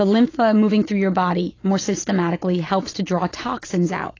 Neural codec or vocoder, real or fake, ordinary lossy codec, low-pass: none; real; AAC, 32 kbps; 7.2 kHz